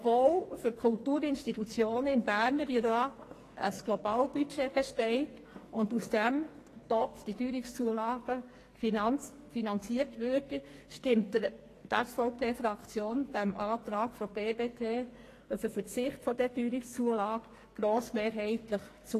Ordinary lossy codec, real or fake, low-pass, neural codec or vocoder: AAC, 48 kbps; fake; 14.4 kHz; codec, 44.1 kHz, 2.6 kbps, SNAC